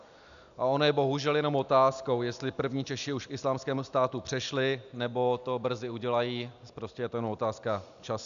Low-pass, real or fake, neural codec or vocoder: 7.2 kHz; real; none